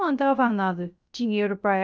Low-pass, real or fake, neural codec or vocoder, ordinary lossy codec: none; fake; codec, 16 kHz, 0.3 kbps, FocalCodec; none